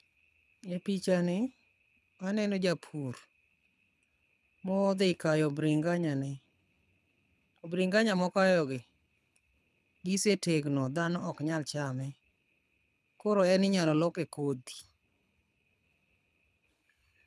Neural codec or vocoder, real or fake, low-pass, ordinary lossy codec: codec, 24 kHz, 6 kbps, HILCodec; fake; none; none